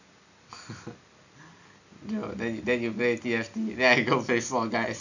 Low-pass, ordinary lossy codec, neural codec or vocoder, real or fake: 7.2 kHz; none; none; real